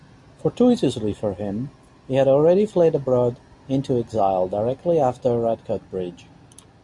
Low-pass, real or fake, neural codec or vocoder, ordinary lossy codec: 10.8 kHz; real; none; MP3, 64 kbps